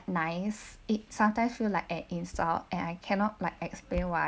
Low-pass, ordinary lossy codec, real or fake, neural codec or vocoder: none; none; real; none